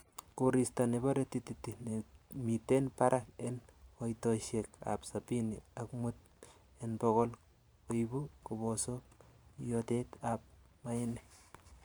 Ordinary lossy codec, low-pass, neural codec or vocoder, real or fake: none; none; vocoder, 44.1 kHz, 128 mel bands every 512 samples, BigVGAN v2; fake